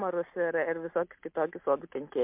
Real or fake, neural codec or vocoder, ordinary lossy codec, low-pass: real; none; MP3, 32 kbps; 3.6 kHz